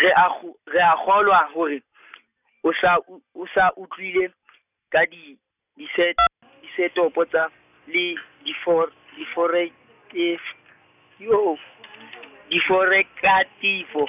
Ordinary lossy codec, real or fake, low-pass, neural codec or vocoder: none; real; 3.6 kHz; none